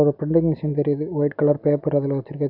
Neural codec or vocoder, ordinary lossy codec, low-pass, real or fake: none; AAC, 48 kbps; 5.4 kHz; real